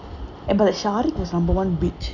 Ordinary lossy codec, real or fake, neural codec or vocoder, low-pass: none; real; none; 7.2 kHz